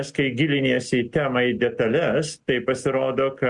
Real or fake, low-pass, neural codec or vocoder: real; 10.8 kHz; none